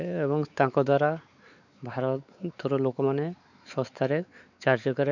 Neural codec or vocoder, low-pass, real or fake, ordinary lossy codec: codec, 16 kHz, 4 kbps, X-Codec, WavLM features, trained on Multilingual LibriSpeech; 7.2 kHz; fake; none